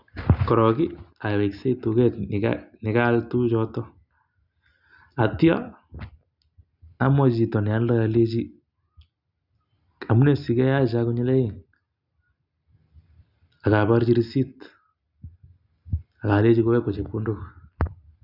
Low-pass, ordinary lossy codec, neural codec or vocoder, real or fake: 5.4 kHz; none; none; real